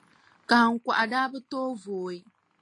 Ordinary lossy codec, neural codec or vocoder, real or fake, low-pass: AAC, 32 kbps; none; real; 10.8 kHz